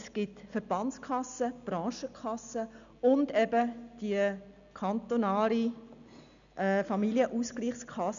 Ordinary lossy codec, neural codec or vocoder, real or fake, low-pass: none; none; real; 7.2 kHz